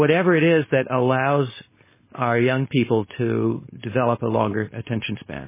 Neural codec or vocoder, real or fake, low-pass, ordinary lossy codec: codec, 16 kHz, 4.8 kbps, FACodec; fake; 3.6 kHz; MP3, 16 kbps